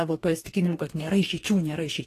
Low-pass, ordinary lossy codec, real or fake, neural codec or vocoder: 14.4 kHz; AAC, 48 kbps; fake; codec, 44.1 kHz, 2.6 kbps, DAC